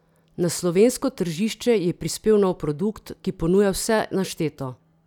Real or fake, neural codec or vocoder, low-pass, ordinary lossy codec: real; none; 19.8 kHz; none